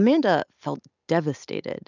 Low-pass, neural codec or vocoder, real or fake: 7.2 kHz; none; real